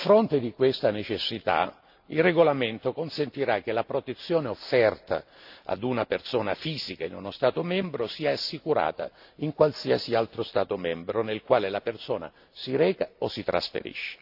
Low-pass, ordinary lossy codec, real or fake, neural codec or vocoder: 5.4 kHz; AAC, 48 kbps; real; none